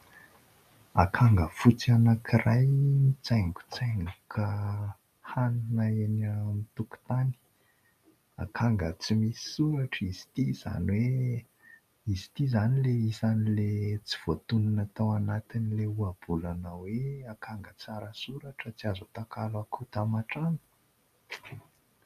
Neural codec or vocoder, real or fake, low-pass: none; real; 14.4 kHz